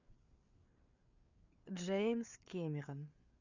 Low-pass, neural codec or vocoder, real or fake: 7.2 kHz; codec, 16 kHz, 4 kbps, FreqCodec, larger model; fake